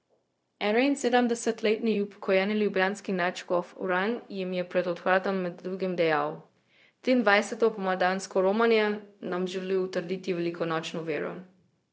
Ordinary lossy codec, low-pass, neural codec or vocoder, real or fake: none; none; codec, 16 kHz, 0.4 kbps, LongCat-Audio-Codec; fake